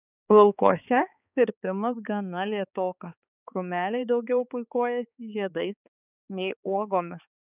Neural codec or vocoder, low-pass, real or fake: codec, 16 kHz, 4 kbps, X-Codec, HuBERT features, trained on balanced general audio; 3.6 kHz; fake